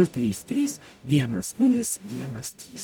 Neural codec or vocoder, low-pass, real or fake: codec, 44.1 kHz, 0.9 kbps, DAC; 19.8 kHz; fake